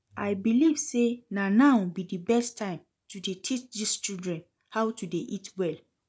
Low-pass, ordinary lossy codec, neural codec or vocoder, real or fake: none; none; none; real